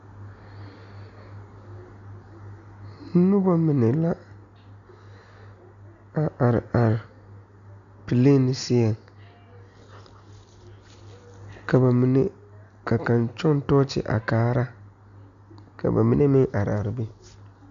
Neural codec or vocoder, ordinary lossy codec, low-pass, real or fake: none; AAC, 96 kbps; 7.2 kHz; real